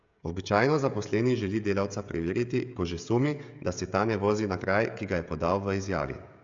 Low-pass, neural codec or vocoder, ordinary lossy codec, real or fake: 7.2 kHz; codec, 16 kHz, 16 kbps, FreqCodec, smaller model; AAC, 64 kbps; fake